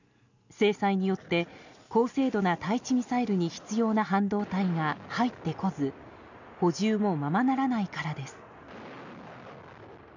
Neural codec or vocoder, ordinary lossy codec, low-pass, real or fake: none; none; 7.2 kHz; real